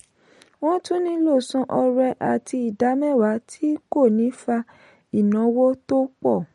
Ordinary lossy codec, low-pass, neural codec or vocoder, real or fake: MP3, 48 kbps; 19.8 kHz; none; real